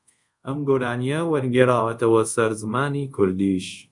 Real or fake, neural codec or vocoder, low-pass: fake; codec, 24 kHz, 0.5 kbps, DualCodec; 10.8 kHz